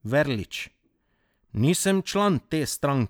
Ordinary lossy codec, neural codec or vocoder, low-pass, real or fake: none; none; none; real